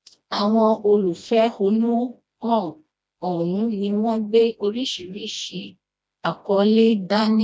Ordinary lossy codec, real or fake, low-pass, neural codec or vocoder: none; fake; none; codec, 16 kHz, 1 kbps, FreqCodec, smaller model